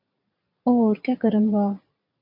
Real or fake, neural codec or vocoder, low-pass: fake; vocoder, 22.05 kHz, 80 mel bands, Vocos; 5.4 kHz